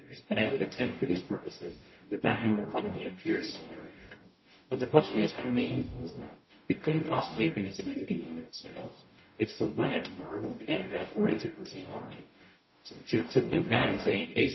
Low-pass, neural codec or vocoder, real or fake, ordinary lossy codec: 7.2 kHz; codec, 44.1 kHz, 0.9 kbps, DAC; fake; MP3, 24 kbps